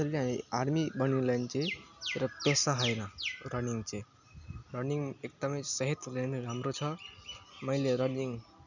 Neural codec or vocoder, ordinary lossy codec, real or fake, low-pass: none; none; real; 7.2 kHz